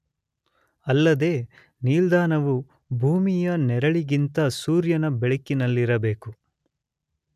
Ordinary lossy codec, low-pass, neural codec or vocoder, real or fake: none; 14.4 kHz; none; real